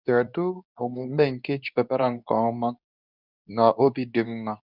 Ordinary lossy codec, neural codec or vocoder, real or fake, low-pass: none; codec, 24 kHz, 0.9 kbps, WavTokenizer, medium speech release version 2; fake; 5.4 kHz